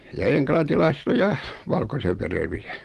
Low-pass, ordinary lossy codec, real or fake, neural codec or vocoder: 14.4 kHz; Opus, 24 kbps; real; none